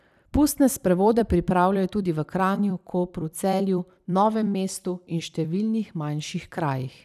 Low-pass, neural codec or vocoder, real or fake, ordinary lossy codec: 14.4 kHz; vocoder, 44.1 kHz, 128 mel bands every 256 samples, BigVGAN v2; fake; none